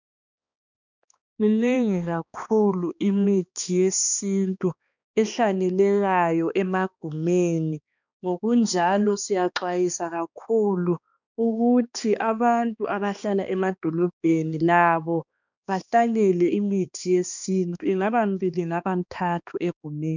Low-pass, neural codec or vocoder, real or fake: 7.2 kHz; codec, 16 kHz, 2 kbps, X-Codec, HuBERT features, trained on balanced general audio; fake